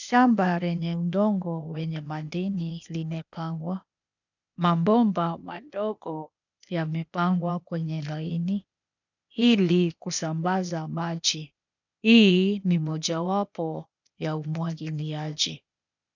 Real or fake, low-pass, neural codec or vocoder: fake; 7.2 kHz; codec, 16 kHz, 0.8 kbps, ZipCodec